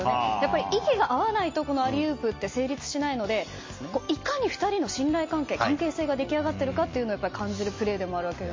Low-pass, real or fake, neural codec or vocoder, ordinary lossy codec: 7.2 kHz; real; none; MP3, 32 kbps